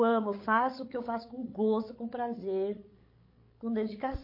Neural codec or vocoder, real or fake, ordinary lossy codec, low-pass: codec, 16 kHz, 8 kbps, FreqCodec, larger model; fake; MP3, 32 kbps; 5.4 kHz